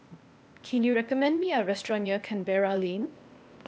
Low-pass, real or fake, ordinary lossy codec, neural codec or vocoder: none; fake; none; codec, 16 kHz, 0.8 kbps, ZipCodec